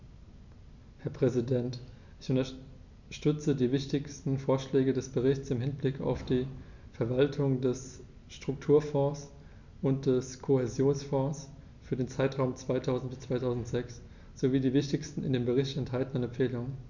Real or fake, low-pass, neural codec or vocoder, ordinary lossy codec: real; 7.2 kHz; none; none